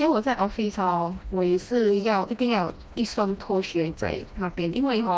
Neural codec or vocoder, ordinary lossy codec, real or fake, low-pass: codec, 16 kHz, 1 kbps, FreqCodec, smaller model; none; fake; none